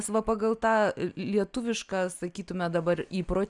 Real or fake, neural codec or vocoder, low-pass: real; none; 10.8 kHz